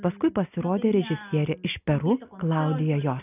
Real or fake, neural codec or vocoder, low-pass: real; none; 3.6 kHz